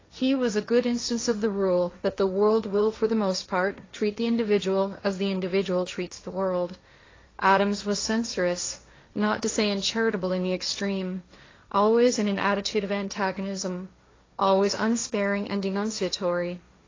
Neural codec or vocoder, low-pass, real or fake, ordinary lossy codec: codec, 16 kHz, 1.1 kbps, Voila-Tokenizer; 7.2 kHz; fake; AAC, 32 kbps